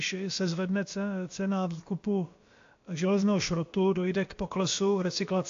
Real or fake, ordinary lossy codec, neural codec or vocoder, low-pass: fake; AAC, 48 kbps; codec, 16 kHz, about 1 kbps, DyCAST, with the encoder's durations; 7.2 kHz